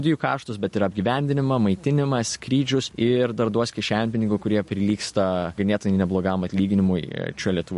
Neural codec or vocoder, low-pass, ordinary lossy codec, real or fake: none; 14.4 kHz; MP3, 48 kbps; real